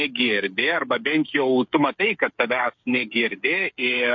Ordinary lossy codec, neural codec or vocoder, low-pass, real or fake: MP3, 48 kbps; codec, 16 kHz, 8 kbps, FreqCodec, smaller model; 7.2 kHz; fake